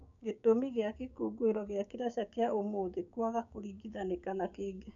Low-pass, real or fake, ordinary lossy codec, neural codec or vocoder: 7.2 kHz; fake; none; codec, 16 kHz, 6 kbps, DAC